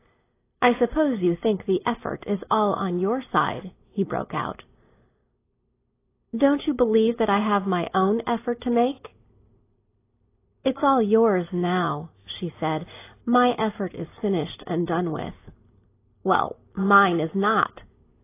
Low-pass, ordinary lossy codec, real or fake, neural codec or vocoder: 3.6 kHz; AAC, 24 kbps; real; none